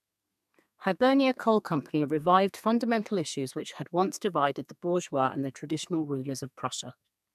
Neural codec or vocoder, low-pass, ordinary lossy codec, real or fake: codec, 32 kHz, 1.9 kbps, SNAC; 14.4 kHz; none; fake